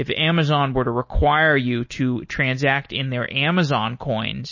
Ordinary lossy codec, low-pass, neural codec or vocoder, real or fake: MP3, 32 kbps; 7.2 kHz; none; real